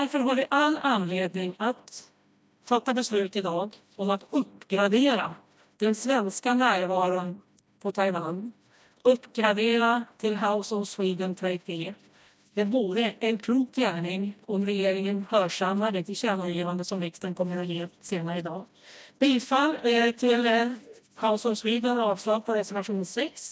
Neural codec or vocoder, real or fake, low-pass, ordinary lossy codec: codec, 16 kHz, 1 kbps, FreqCodec, smaller model; fake; none; none